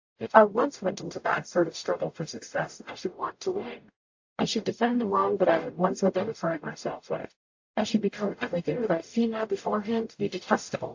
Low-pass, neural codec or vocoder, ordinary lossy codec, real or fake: 7.2 kHz; codec, 44.1 kHz, 0.9 kbps, DAC; AAC, 48 kbps; fake